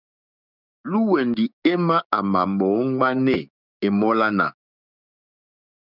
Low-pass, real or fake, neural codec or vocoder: 5.4 kHz; fake; codec, 16 kHz, 6 kbps, DAC